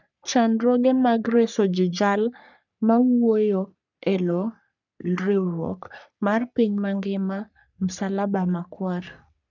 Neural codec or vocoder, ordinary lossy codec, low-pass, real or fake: codec, 44.1 kHz, 3.4 kbps, Pupu-Codec; none; 7.2 kHz; fake